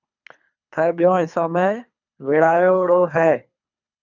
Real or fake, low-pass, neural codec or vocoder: fake; 7.2 kHz; codec, 24 kHz, 3 kbps, HILCodec